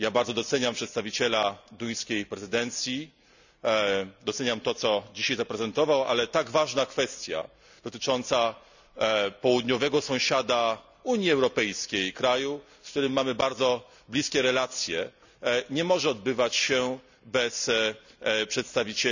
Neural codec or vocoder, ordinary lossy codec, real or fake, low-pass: none; none; real; 7.2 kHz